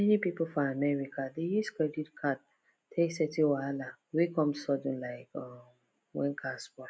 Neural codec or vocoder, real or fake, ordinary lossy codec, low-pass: none; real; none; none